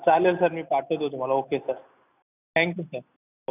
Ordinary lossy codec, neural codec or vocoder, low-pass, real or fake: none; none; 3.6 kHz; real